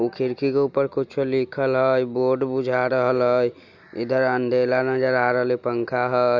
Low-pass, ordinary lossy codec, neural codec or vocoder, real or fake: 7.2 kHz; none; none; real